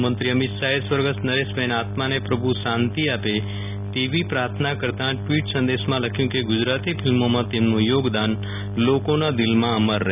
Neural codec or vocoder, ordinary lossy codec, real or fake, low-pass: none; none; real; 3.6 kHz